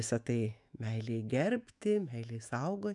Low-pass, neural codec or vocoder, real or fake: 10.8 kHz; autoencoder, 48 kHz, 128 numbers a frame, DAC-VAE, trained on Japanese speech; fake